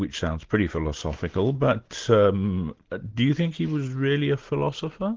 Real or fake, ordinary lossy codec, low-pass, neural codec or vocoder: real; Opus, 16 kbps; 7.2 kHz; none